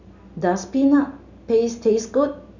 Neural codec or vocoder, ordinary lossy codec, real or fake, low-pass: none; none; real; 7.2 kHz